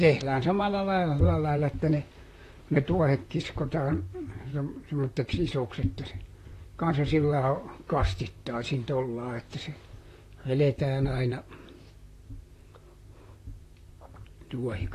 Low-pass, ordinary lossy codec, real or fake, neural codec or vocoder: 14.4 kHz; AAC, 48 kbps; fake; vocoder, 44.1 kHz, 128 mel bands, Pupu-Vocoder